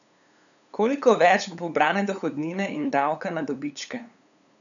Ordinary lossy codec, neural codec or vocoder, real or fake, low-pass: none; codec, 16 kHz, 8 kbps, FunCodec, trained on LibriTTS, 25 frames a second; fake; 7.2 kHz